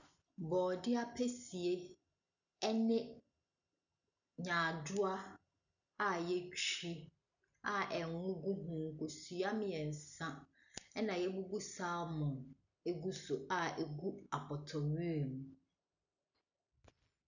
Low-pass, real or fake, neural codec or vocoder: 7.2 kHz; real; none